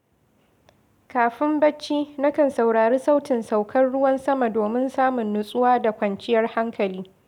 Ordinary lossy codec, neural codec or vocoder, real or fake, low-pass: none; none; real; 19.8 kHz